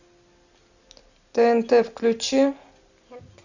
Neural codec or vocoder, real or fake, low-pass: none; real; 7.2 kHz